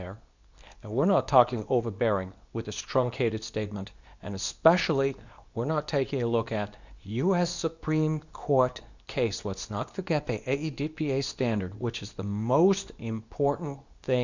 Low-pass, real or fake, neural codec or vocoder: 7.2 kHz; fake; codec, 24 kHz, 0.9 kbps, WavTokenizer, medium speech release version 2